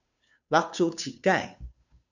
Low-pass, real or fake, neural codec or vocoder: 7.2 kHz; fake; codec, 16 kHz, 2 kbps, FunCodec, trained on Chinese and English, 25 frames a second